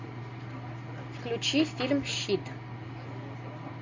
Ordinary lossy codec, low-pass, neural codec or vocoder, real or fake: MP3, 48 kbps; 7.2 kHz; none; real